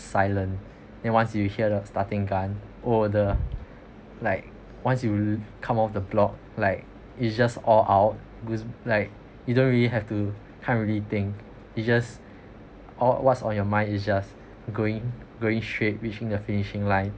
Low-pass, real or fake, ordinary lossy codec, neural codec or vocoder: none; real; none; none